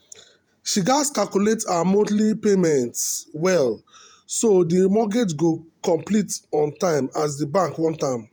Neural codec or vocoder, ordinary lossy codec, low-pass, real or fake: vocoder, 48 kHz, 128 mel bands, Vocos; none; none; fake